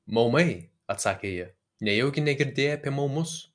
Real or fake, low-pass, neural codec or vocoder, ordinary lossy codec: real; 9.9 kHz; none; MP3, 64 kbps